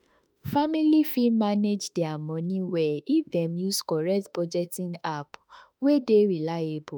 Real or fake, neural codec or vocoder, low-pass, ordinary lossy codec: fake; autoencoder, 48 kHz, 32 numbers a frame, DAC-VAE, trained on Japanese speech; none; none